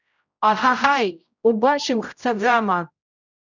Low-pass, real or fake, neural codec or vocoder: 7.2 kHz; fake; codec, 16 kHz, 0.5 kbps, X-Codec, HuBERT features, trained on general audio